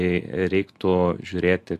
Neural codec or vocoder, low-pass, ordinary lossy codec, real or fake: none; 14.4 kHz; AAC, 96 kbps; real